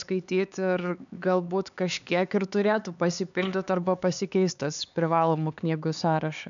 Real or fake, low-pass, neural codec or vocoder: fake; 7.2 kHz; codec, 16 kHz, 4 kbps, X-Codec, HuBERT features, trained on LibriSpeech